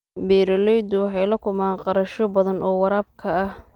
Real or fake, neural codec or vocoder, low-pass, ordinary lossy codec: real; none; 19.8 kHz; Opus, 32 kbps